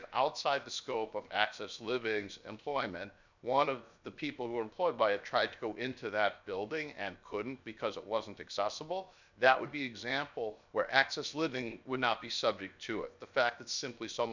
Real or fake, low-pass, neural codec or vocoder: fake; 7.2 kHz; codec, 16 kHz, 0.7 kbps, FocalCodec